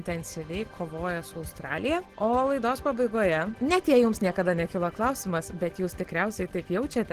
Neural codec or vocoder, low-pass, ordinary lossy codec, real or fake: none; 14.4 kHz; Opus, 16 kbps; real